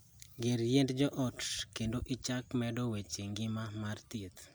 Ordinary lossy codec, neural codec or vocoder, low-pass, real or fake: none; none; none; real